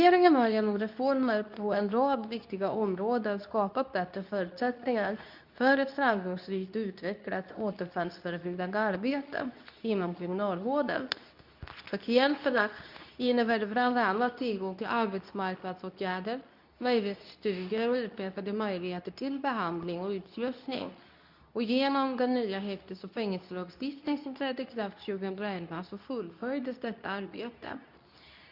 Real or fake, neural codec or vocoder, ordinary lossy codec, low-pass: fake; codec, 24 kHz, 0.9 kbps, WavTokenizer, medium speech release version 2; none; 5.4 kHz